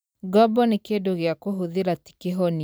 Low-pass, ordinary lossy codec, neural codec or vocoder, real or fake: none; none; vocoder, 44.1 kHz, 128 mel bands every 256 samples, BigVGAN v2; fake